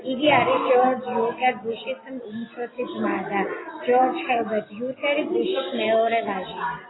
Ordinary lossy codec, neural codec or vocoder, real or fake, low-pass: AAC, 16 kbps; none; real; 7.2 kHz